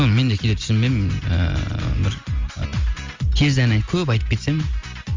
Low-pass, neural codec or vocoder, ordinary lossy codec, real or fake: 7.2 kHz; none; Opus, 32 kbps; real